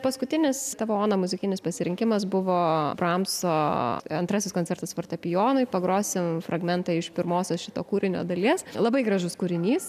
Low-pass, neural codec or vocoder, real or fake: 14.4 kHz; none; real